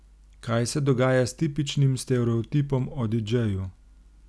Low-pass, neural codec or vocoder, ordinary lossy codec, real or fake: none; none; none; real